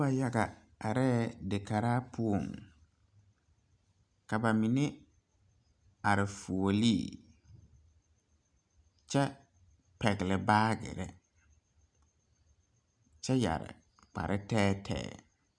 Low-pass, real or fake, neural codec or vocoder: 9.9 kHz; real; none